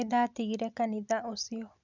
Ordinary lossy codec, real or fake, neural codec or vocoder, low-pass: none; real; none; 7.2 kHz